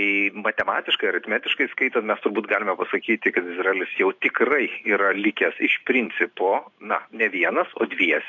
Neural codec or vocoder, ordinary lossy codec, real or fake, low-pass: none; AAC, 48 kbps; real; 7.2 kHz